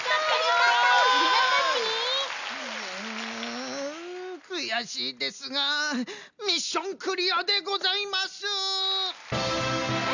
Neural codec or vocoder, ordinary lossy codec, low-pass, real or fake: none; none; 7.2 kHz; real